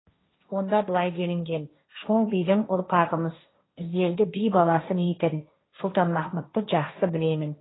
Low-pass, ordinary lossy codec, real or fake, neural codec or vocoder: 7.2 kHz; AAC, 16 kbps; fake; codec, 16 kHz, 1.1 kbps, Voila-Tokenizer